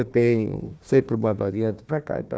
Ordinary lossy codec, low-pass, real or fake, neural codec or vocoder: none; none; fake; codec, 16 kHz, 1 kbps, FunCodec, trained on Chinese and English, 50 frames a second